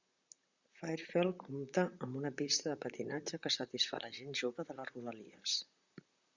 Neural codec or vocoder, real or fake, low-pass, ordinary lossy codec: vocoder, 44.1 kHz, 128 mel bands, Pupu-Vocoder; fake; 7.2 kHz; Opus, 64 kbps